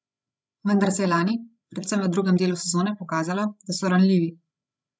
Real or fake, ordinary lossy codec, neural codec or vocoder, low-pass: fake; none; codec, 16 kHz, 16 kbps, FreqCodec, larger model; none